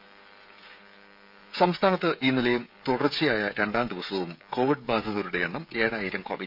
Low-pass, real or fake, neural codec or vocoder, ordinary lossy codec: 5.4 kHz; fake; codec, 16 kHz, 16 kbps, FreqCodec, smaller model; none